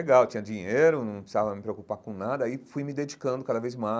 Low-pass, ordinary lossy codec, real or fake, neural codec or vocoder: none; none; real; none